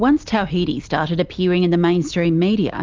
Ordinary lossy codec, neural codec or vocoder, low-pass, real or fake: Opus, 32 kbps; none; 7.2 kHz; real